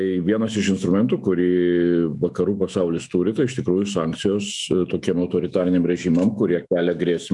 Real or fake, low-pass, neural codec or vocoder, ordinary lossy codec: fake; 10.8 kHz; autoencoder, 48 kHz, 128 numbers a frame, DAC-VAE, trained on Japanese speech; MP3, 96 kbps